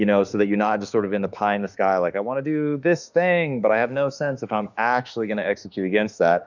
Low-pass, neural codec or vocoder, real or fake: 7.2 kHz; autoencoder, 48 kHz, 32 numbers a frame, DAC-VAE, trained on Japanese speech; fake